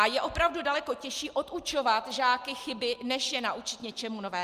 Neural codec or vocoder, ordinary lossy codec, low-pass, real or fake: none; Opus, 32 kbps; 14.4 kHz; real